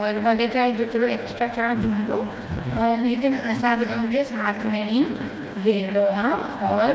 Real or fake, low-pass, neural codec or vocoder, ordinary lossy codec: fake; none; codec, 16 kHz, 1 kbps, FreqCodec, smaller model; none